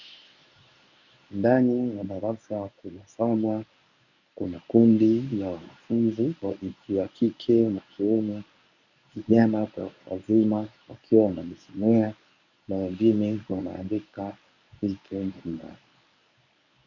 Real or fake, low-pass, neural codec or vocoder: fake; 7.2 kHz; codec, 24 kHz, 0.9 kbps, WavTokenizer, medium speech release version 1